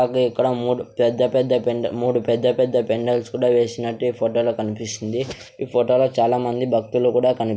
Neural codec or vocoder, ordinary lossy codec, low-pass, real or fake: none; none; none; real